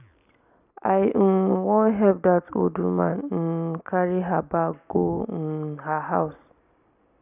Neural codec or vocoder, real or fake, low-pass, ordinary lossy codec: none; real; 3.6 kHz; none